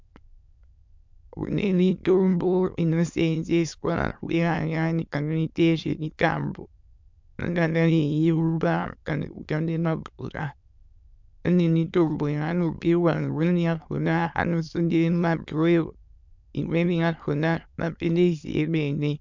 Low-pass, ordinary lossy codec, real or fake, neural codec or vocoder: 7.2 kHz; MP3, 64 kbps; fake; autoencoder, 22.05 kHz, a latent of 192 numbers a frame, VITS, trained on many speakers